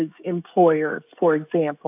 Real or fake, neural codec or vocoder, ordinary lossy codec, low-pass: fake; codec, 16 kHz, 16 kbps, FreqCodec, smaller model; AAC, 32 kbps; 3.6 kHz